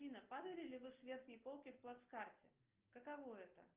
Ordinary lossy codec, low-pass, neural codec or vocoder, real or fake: Opus, 32 kbps; 3.6 kHz; none; real